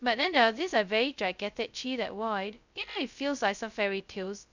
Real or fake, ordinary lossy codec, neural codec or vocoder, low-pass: fake; none; codec, 16 kHz, 0.2 kbps, FocalCodec; 7.2 kHz